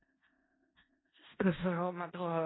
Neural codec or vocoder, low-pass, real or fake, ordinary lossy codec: codec, 16 kHz in and 24 kHz out, 0.4 kbps, LongCat-Audio-Codec, four codebook decoder; 7.2 kHz; fake; AAC, 16 kbps